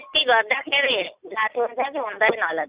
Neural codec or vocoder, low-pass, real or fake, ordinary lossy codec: none; 3.6 kHz; real; Opus, 64 kbps